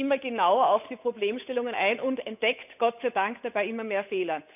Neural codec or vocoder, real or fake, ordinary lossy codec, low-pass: codec, 16 kHz, 8 kbps, FunCodec, trained on Chinese and English, 25 frames a second; fake; none; 3.6 kHz